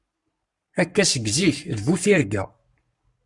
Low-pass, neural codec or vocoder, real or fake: 10.8 kHz; codec, 44.1 kHz, 7.8 kbps, Pupu-Codec; fake